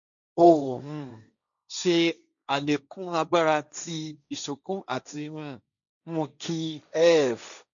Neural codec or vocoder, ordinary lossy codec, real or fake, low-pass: codec, 16 kHz, 1.1 kbps, Voila-Tokenizer; none; fake; 7.2 kHz